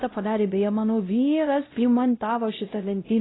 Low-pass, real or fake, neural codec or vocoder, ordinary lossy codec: 7.2 kHz; fake; codec, 16 kHz, 0.5 kbps, X-Codec, WavLM features, trained on Multilingual LibriSpeech; AAC, 16 kbps